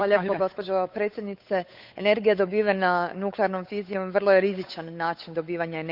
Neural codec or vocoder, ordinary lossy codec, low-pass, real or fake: codec, 16 kHz, 8 kbps, FunCodec, trained on Chinese and English, 25 frames a second; none; 5.4 kHz; fake